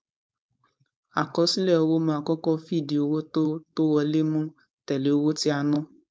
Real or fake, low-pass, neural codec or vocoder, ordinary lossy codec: fake; none; codec, 16 kHz, 4.8 kbps, FACodec; none